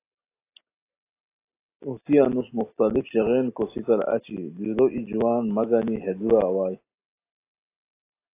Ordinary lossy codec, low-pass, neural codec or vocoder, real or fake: AAC, 24 kbps; 3.6 kHz; none; real